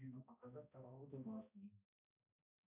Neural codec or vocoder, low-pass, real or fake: codec, 16 kHz, 0.5 kbps, X-Codec, HuBERT features, trained on balanced general audio; 3.6 kHz; fake